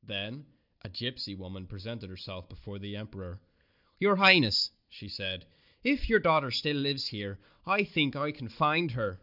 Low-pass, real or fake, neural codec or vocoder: 5.4 kHz; real; none